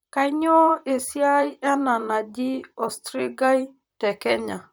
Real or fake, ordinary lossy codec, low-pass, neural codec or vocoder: fake; none; none; vocoder, 44.1 kHz, 128 mel bands, Pupu-Vocoder